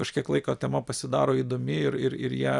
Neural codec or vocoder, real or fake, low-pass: vocoder, 44.1 kHz, 128 mel bands every 256 samples, BigVGAN v2; fake; 10.8 kHz